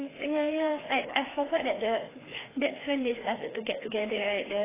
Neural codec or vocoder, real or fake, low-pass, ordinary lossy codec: codec, 16 kHz, 2 kbps, FreqCodec, larger model; fake; 3.6 kHz; AAC, 16 kbps